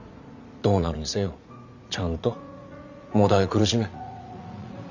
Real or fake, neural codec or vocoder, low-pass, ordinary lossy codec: fake; vocoder, 44.1 kHz, 80 mel bands, Vocos; 7.2 kHz; none